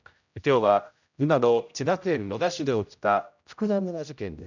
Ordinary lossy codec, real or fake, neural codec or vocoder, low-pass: none; fake; codec, 16 kHz, 0.5 kbps, X-Codec, HuBERT features, trained on general audio; 7.2 kHz